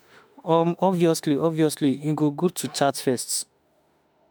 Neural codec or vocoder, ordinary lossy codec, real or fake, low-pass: autoencoder, 48 kHz, 32 numbers a frame, DAC-VAE, trained on Japanese speech; none; fake; none